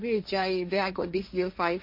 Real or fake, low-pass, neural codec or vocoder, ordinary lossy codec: fake; 5.4 kHz; codec, 16 kHz, 1.1 kbps, Voila-Tokenizer; MP3, 32 kbps